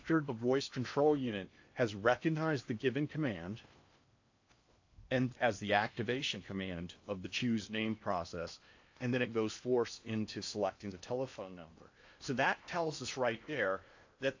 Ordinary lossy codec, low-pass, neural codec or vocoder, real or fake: AAC, 48 kbps; 7.2 kHz; codec, 16 kHz in and 24 kHz out, 0.8 kbps, FocalCodec, streaming, 65536 codes; fake